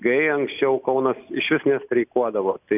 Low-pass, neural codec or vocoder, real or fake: 3.6 kHz; none; real